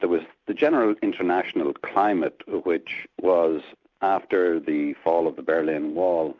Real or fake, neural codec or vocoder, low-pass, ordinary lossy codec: real; none; 7.2 kHz; MP3, 64 kbps